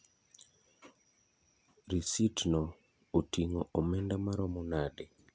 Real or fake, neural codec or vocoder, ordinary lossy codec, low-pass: real; none; none; none